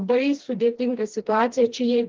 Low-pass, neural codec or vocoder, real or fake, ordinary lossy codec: 7.2 kHz; codec, 16 kHz, 2 kbps, FreqCodec, smaller model; fake; Opus, 16 kbps